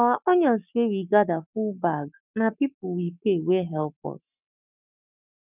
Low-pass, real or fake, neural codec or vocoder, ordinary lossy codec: 3.6 kHz; fake; codec, 44.1 kHz, 7.8 kbps, Pupu-Codec; none